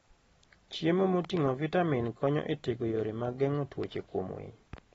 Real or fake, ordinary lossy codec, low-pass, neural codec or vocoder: real; AAC, 24 kbps; 9.9 kHz; none